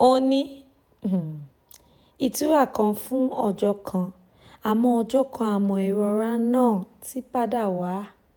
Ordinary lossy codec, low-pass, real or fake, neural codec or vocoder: none; none; fake; vocoder, 48 kHz, 128 mel bands, Vocos